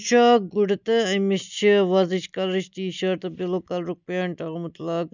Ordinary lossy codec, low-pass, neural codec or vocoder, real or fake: none; 7.2 kHz; none; real